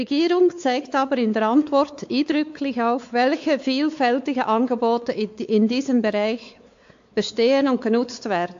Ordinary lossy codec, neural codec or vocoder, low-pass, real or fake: AAC, 64 kbps; codec, 16 kHz, 4 kbps, X-Codec, WavLM features, trained on Multilingual LibriSpeech; 7.2 kHz; fake